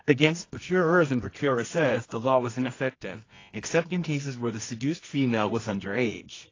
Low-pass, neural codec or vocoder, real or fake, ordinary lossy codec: 7.2 kHz; codec, 24 kHz, 0.9 kbps, WavTokenizer, medium music audio release; fake; AAC, 32 kbps